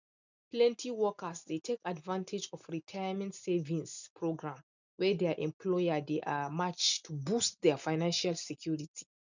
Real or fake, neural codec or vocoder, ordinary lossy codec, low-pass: real; none; none; 7.2 kHz